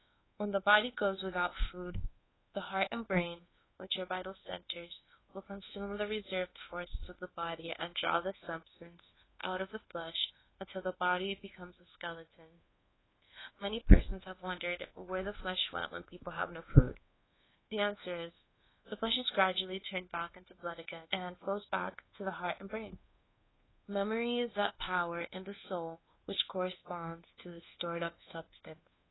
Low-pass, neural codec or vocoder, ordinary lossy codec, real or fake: 7.2 kHz; codec, 24 kHz, 1.2 kbps, DualCodec; AAC, 16 kbps; fake